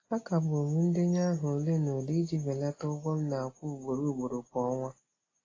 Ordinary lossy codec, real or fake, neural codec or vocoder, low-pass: AAC, 32 kbps; real; none; 7.2 kHz